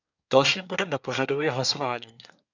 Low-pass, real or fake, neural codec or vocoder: 7.2 kHz; fake; codec, 24 kHz, 1 kbps, SNAC